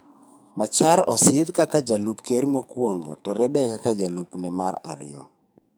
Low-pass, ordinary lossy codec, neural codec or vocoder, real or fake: none; none; codec, 44.1 kHz, 2.6 kbps, SNAC; fake